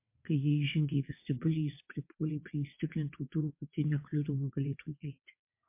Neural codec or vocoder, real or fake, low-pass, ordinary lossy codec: vocoder, 22.05 kHz, 80 mel bands, WaveNeXt; fake; 3.6 kHz; MP3, 24 kbps